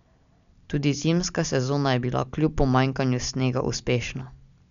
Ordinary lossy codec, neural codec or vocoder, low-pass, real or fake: none; none; 7.2 kHz; real